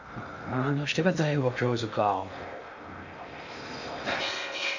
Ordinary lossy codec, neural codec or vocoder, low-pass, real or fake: none; codec, 16 kHz in and 24 kHz out, 0.6 kbps, FocalCodec, streaming, 2048 codes; 7.2 kHz; fake